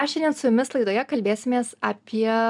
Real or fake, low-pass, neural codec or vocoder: real; 10.8 kHz; none